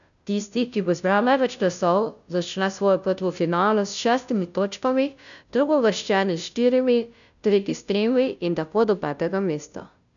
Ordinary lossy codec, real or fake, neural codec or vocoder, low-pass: none; fake; codec, 16 kHz, 0.5 kbps, FunCodec, trained on Chinese and English, 25 frames a second; 7.2 kHz